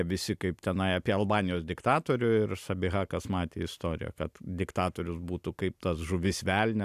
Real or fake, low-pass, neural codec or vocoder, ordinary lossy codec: real; 14.4 kHz; none; AAC, 96 kbps